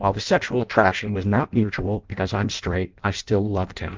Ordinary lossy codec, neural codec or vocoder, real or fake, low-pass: Opus, 24 kbps; codec, 16 kHz in and 24 kHz out, 0.6 kbps, FireRedTTS-2 codec; fake; 7.2 kHz